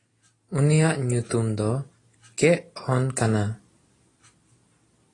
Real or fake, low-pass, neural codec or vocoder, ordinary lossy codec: real; 10.8 kHz; none; AAC, 32 kbps